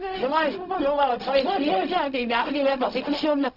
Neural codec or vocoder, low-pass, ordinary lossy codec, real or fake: codec, 16 kHz, 0.4 kbps, LongCat-Audio-Codec; 5.4 kHz; none; fake